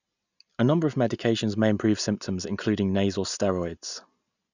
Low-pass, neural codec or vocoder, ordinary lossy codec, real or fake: 7.2 kHz; none; none; real